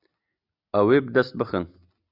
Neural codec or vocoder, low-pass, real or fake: none; 5.4 kHz; real